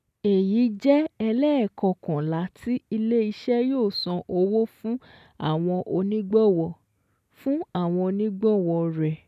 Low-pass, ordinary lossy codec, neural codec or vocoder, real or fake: 14.4 kHz; none; none; real